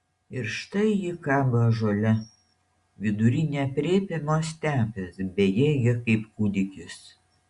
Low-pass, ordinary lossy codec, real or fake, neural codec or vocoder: 10.8 kHz; Opus, 64 kbps; real; none